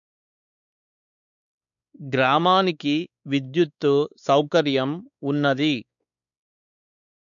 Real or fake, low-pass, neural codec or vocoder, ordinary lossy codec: fake; 7.2 kHz; codec, 16 kHz, 4 kbps, X-Codec, WavLM features, trained on Multilingual LibriSpeech; none